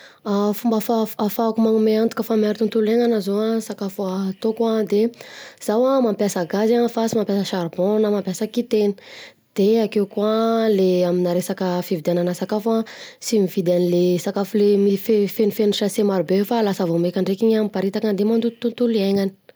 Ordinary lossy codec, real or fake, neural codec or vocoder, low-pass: none; real; none; none